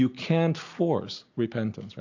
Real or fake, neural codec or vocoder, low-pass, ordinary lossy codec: real; none; 7.2 kHz; AAC, 48 kbps